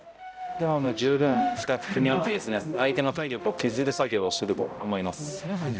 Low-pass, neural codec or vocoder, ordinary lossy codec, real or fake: none; codec, 16 kHz, 0.5 kbps, X-Codec, HuBERT features, trained on balanced general audio; none; fake